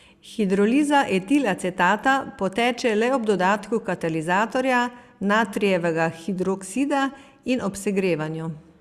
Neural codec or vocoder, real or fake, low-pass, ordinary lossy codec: none; real; 14.4 kHz; Opus, 64 kbps